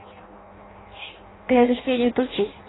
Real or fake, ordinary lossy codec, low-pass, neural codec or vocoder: fake; AAC, 16 kbps; 7.2 kHz; codec, 16 kHz in and 24 kHz out, 0.6 kbps, FireRedTTS-2 codec